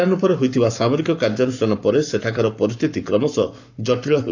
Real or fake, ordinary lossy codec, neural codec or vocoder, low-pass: fake; none; codec, 44.1 kHz, 7.8 kbps, Pupu-Codec; 7.2 kHz